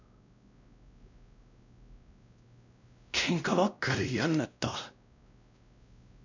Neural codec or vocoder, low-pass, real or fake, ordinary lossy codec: codec, 16 kHz, 1 kbps, X-Codec, WavLM features, trained on Multilingual LibriSpeech; 7.2 kHz; fake; none